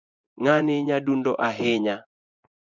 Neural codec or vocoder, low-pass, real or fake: vocoder, 24 kHz, 100 mel bands, Vocos; 7.2 kHz; fake